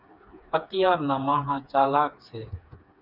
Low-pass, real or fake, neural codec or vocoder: 5.4 kHz; fake; codec, 16 kHz, 4 kbps, FreqCodec, smaller model